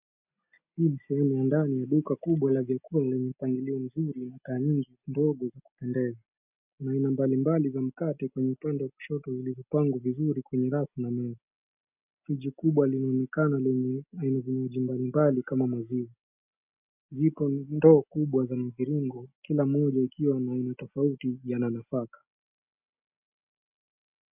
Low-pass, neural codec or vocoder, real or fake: 3.6 kHz; none; real